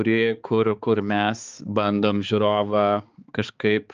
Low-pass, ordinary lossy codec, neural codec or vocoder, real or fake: 7.2 kHz; Opus, 24 kbps; codec, 16 kHz, 4 kbps, X-Codec, HuBERT features, trained on balanced general audio; fake